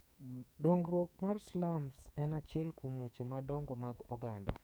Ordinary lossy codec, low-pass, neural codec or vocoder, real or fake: none; none; codec, 44.1 kHz, 2.6 kbps, SNAC; fake